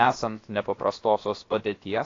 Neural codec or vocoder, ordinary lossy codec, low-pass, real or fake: codec, 16 kHz, about 1 kbps, DyCAST, with the encoder's durations; AAC, 32 kbps; 7.2 kHz; fake